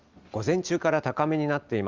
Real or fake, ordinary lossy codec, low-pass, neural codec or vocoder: real; Opus, 32 kbps; 7.2 kHz; none